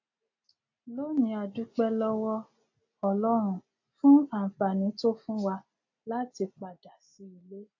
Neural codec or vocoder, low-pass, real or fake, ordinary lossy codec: none; 7.2 kHz; real; AAC, 48 kbps